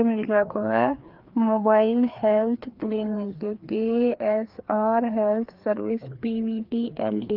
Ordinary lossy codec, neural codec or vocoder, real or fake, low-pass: Opus, 32 kbps; codec, 16 kHz, 2 kbps, FreqCodec, larger model; fake; 5.4 kHz